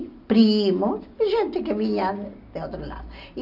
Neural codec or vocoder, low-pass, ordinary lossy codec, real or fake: none; 5.4 kHz; none; real